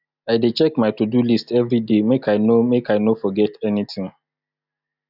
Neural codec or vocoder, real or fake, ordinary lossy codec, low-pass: none; real; none; 5.4 kHz